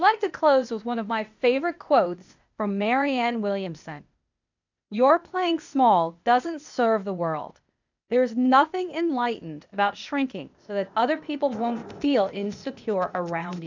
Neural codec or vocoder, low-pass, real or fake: codec, 16 kHz, 0.8 kbps, ZipCodec; 7.2 kHz; fake